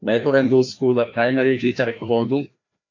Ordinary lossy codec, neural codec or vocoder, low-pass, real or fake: AAC, 48 kbps; codec, 16 kHz, 1 kbps, FreqCodec, larger model; 7.2 kHz; fake